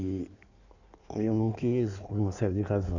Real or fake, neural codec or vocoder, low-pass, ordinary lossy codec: fake; codec, 16 kHz in and 24 kHz out, 1.1 kbps, FireRedTTS-2 codec; 7.2 kHz; none